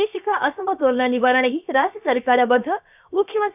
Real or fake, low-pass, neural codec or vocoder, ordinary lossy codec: fake; 3.6 kHz; codec, 16 kHz, about 1 kbps, DyCAST, with the encoder's durations; none